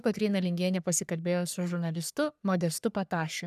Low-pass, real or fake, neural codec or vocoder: 14.4 kHz; fake; codec, 44.1 kHz, 3.4 kbps, Pupu-Codec